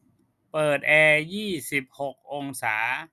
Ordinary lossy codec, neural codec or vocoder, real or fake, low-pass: none; none; real; 14.4 kHz